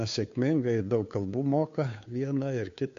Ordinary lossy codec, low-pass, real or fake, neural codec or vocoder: MP3, 48 kbps; 7.2 kHz; fake; codec, 16 kHz, 2 kbps, FunCodec, trained on Chinese and English, 25 frames a second